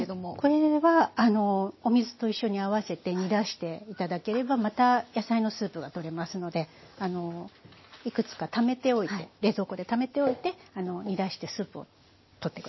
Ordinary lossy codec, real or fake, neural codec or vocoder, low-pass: MP3, 24 kbps; real; none; 7.2 kHz